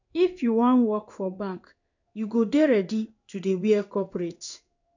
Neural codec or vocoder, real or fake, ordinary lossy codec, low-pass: codec, 16 kHz in and 24 kHz out, 1 kbps, XY-Tokenizer; fake; none; 7.2 kHz